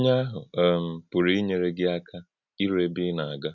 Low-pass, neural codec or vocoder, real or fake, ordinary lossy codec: 7.2 kHz; none; real; none